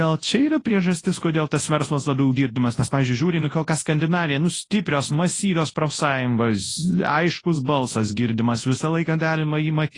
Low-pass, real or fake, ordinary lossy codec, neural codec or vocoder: 10.8 kHz; fake; AAC, 32 kbps; codec, 24 kHz, 0.9 kbps, WavTokenizer, large speech release